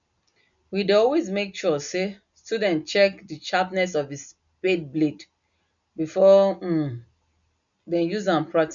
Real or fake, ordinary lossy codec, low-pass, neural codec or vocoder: real; none; 7.2 kHz; none